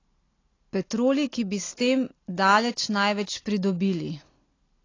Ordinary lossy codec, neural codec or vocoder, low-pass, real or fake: AAC, 32 kbps; none; 7.2 kHz; real